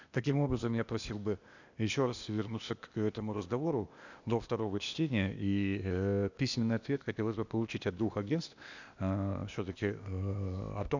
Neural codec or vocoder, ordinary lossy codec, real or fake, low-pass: codec, 16 kHz, 0.8 kbps, ZipCodec; none; fake; 7.2 kHz